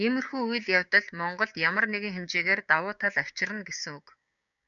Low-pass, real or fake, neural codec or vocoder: 7.2 kHz; fake; codec, 16 kHz, 6 kbps, DAC